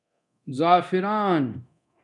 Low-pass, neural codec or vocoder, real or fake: 10.8 kHz; codec, 24 kHz, 0.9 kbps, DualCodec; fake